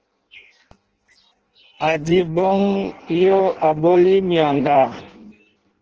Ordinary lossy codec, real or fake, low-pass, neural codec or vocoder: Opus, 16 kbps; fake; 7.2 kHz; codec, 16 kHz in and 24 kHz out, 0.6 kbps, FireRedTTS-2 codec